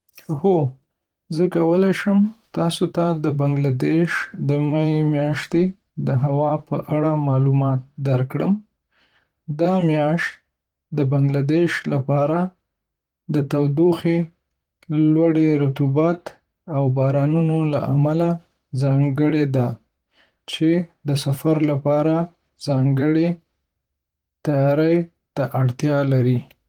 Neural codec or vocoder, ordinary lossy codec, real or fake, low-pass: vocoder, 44.1 kHz, 128 mel bands, Pupu-Vocoder; Opus, 24 kbps; fake; 19.8 kHz